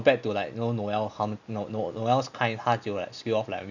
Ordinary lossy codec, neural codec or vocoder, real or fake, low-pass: none; none; real; 7.2 kHz